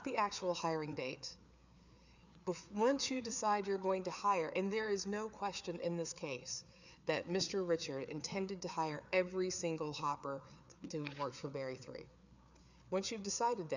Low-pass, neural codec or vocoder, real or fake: 7.2 kHz; codec, 16 kHz, 4 kbps, FreqCodec, larger model; fake